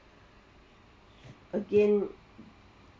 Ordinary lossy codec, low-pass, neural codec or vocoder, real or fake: none; none; none; real